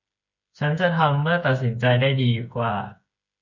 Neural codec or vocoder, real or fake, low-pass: codec, 16 kHz, 8 kbps, FreqCodec, smaller model; fake; 7.2 kHz